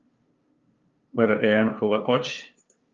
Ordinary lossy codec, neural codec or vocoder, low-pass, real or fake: Opus, 24 kbps; codec, 16 kHz, 2 kbps, FunCodec, trained on LibriTTS, 25 frames a second; 7.2 kHz; fake